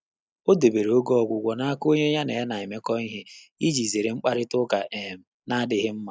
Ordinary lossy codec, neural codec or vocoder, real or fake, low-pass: none; none; real; none